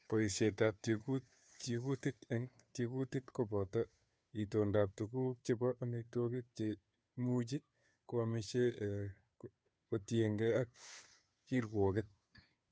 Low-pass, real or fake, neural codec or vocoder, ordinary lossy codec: none; fake; codec, 16 kHz, 2 kbps, FunCodec, trained on Chinese and English, 25 frames a second; none